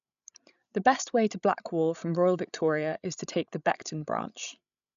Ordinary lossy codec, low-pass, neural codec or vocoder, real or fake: none; 7.2 kHz; codec, 16 kHz, 16 kbps, FreqCodec, larger model; fake